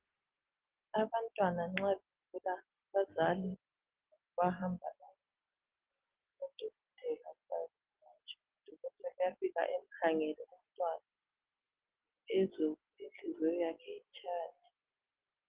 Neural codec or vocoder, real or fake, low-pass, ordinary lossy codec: none; real; 3.6 kHz; Opus, 16 kbps